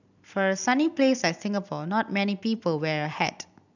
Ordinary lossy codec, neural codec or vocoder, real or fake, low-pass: none; vocoder, 44.1 kHz, 128 mel bands every 256 samples, BigVGAN v2; fake; 7.2 kHz